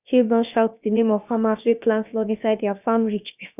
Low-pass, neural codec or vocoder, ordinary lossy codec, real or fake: 3.6 kHz; codec, 16 kHz, about 1 kbps, DyCAST, with the encoder's durations; none; fake